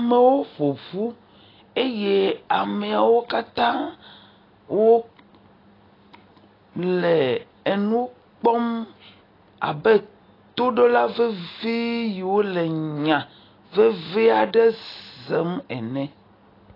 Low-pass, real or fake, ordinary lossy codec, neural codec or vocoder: 5.4 kHz; real; AAC, 24 kbps; none